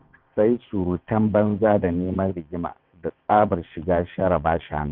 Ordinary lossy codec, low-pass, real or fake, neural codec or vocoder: none; 5.4 kHz; fake; vocoder, 22.05 kHz, 80 mel bands, WaveNeXt